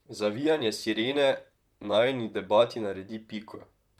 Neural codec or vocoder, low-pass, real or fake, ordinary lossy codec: vocoder, 44.1 kHz, 128 mel bands, Pupu-Vocoder; 19.8 kHz; fake; MP3, 96 kbps